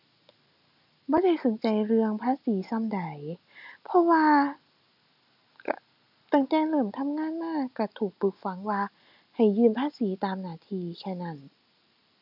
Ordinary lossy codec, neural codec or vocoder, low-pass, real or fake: none; none; 5.4 kHz; real